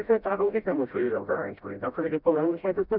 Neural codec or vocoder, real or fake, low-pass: codec, 16 kHz, 0.5 kbps, FreqCodec, smaller model; fake; 5.4 kHz